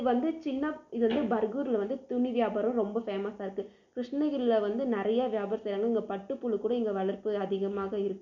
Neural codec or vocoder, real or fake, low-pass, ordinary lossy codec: none; real; 7.2 kHz; none